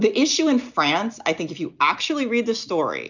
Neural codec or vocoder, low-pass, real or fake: none; 7.2 kHz; real